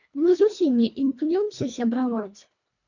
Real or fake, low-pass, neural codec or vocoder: fake; 7.2 kHz; codec, 24 kHz, 1.5 kbps, HILCodec